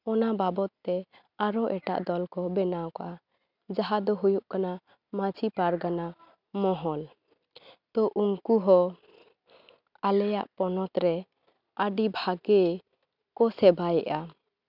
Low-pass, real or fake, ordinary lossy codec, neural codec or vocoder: 5.4 kHz; real; none; none